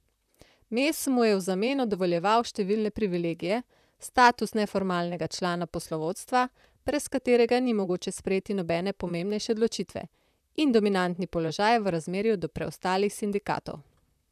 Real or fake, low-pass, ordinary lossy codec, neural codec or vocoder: fake; 14.4 kHz; none; vocoder, 44.1 kHz, 128 mel bands, Pupu-Vocoder